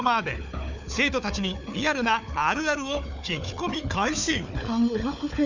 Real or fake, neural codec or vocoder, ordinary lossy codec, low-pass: fake; codec, 16 kHz, 4 kbps, FunCodec, trained on Chinese and English, 50 frames a second; none; 7.2 kHz